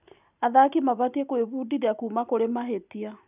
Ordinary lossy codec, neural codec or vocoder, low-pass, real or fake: none; none; 3.6 kHz; real